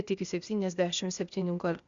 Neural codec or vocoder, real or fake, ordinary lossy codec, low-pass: codec, 16 kHz, 0.8 kbps, ZipCodec; fake; Opus, 64 kbps; 7.2 kHz